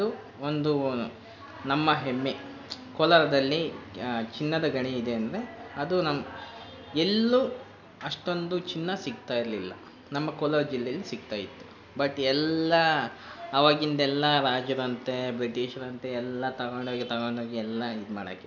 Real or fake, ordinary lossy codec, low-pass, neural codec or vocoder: real; none; 7.2 kHz; none